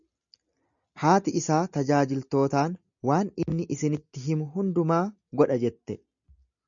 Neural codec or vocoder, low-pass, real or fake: none; 7.2 kHz; real